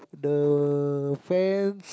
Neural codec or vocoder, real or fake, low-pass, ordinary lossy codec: none; real; none; none